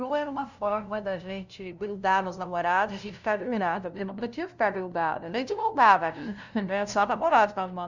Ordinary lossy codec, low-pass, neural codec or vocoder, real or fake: none; 7.2 kHz; codec, 16 kHz, 0.5 kbps, FunCodec, trained on LibriTTS, 25 frames a second; fake